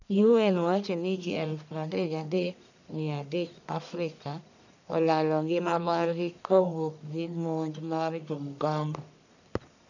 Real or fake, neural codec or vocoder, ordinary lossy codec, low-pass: fake; codec, 44.1 kHz, 1.7 kbps, Pupu-Codec; none; 7.2 kHz